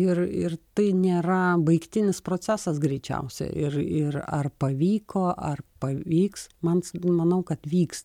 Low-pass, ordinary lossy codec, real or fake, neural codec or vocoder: 19.8 kHz; MP3, 96 kbps; real; none